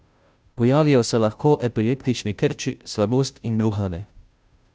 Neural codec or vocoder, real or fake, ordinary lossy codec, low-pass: codec, 16 kHz, 0.5 kbps, FunCodec, trained on Chinese and English, 25 frames a second; fake; none; none